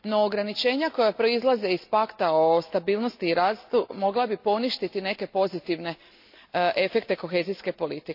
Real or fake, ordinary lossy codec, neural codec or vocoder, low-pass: real; AAC, 48 kbps; none; 5.4 kHz